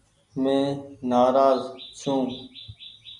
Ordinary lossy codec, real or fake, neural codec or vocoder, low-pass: MP3, 96 kbps; real; none; 10.8 kHz